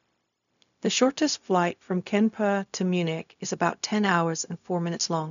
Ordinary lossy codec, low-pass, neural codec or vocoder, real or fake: none; 7.2 kHz; codec, 16 kHz, 0.4 kbps, LongCat-Audio-Codec; fake